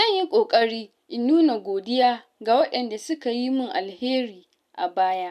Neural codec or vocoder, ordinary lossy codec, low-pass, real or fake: none; none; 14.4 kHz; real